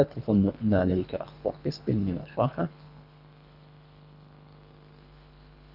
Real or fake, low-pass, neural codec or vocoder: fake; 5.4 kHz; codec, 24 kHz, 3 kbps, HILCodec